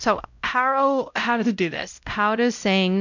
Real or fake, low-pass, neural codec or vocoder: fake; 7.2 kHz; codec, 16 kHz, 0.5 kbps, X-Codec, WavLM features, trained on Multilingual LibriSpeech